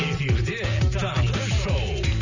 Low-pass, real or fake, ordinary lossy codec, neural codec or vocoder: 7.2 kHz; real; none; none